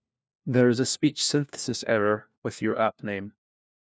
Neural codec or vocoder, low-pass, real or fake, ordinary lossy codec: codec, 16 kHz, 1 kbps, FunCodec, trained on LibriTTS, 50 frames a second; none; fake; none